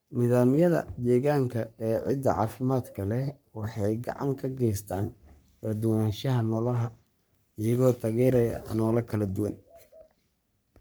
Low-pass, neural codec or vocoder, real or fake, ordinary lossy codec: none; codec, 44.1 kHz, 3.4 kbps, Pupu-Codec; fake; none